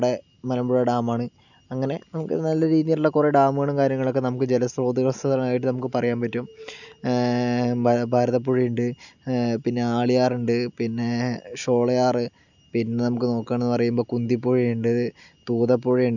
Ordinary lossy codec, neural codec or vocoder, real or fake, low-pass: none; none; real; 7.2 kHz